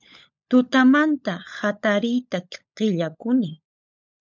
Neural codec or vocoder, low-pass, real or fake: codec, 16 kHz, 16 kbps, FunCodec, trained on LibriTTS, 50 frames a second; 7.2 kHz; fake